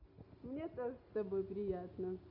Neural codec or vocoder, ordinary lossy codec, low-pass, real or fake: none; none; 5.4 kHz; real